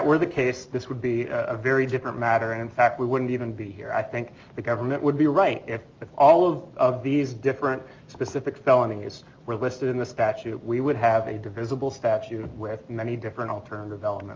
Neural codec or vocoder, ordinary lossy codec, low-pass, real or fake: none; Opus, 24 kbps; 7.2 kHz; real